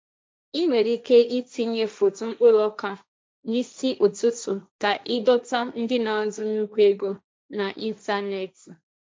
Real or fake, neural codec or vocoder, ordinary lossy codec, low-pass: fake; codec, 16 kHz, 1.1 kbps, Voila-Tokenizer; none; none